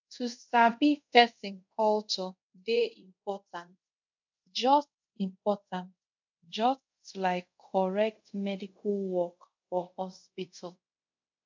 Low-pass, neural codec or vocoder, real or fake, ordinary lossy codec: 7.2 kHz; codec, 24 kHz, 0.5 kbps, DualCodec; fake; MP3, 64 kbps